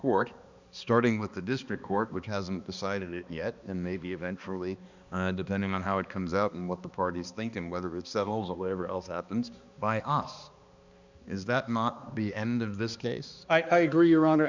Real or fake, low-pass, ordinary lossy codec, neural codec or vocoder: fake; 7.2 kHz; Opus, 64 kbps; codec, 16 kHz, 2 kbps, X-Codec, HuBERT features, trained on balanced general audio